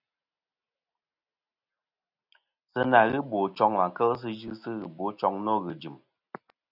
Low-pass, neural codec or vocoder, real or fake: 5.4 kHz; none; real